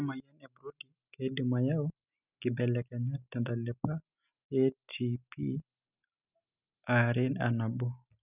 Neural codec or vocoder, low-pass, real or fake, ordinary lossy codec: none; 3.6 kHz; real; none